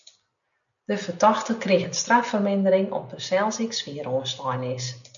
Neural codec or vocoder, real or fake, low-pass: none; real; 7.2 kHz